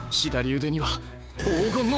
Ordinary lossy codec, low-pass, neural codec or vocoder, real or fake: none; none; codec, 16 kHz, 6 kbps, DAC; fake